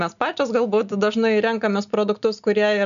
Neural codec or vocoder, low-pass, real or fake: none; 7.2 kHz; real